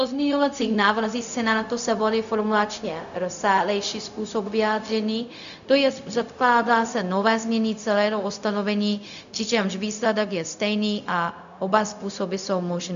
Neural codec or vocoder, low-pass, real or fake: codec, 16 kHz, 0.4 kbps, LongCat-Audio-Codec; 7.2 kHz; fake